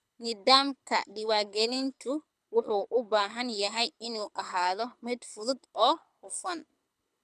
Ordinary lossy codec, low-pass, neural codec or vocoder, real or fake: none; none; codec, 24 kHz, 6 kbps, HILCodec; fake